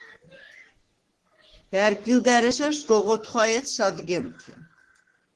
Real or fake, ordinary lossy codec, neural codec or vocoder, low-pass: fake; Opus, 16 kbps; codec, 44.1 kHz, 3.4 kbps, Pupu-Codec; 10.8 kHz